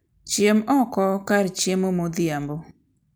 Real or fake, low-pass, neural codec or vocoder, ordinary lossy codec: real; none; none; none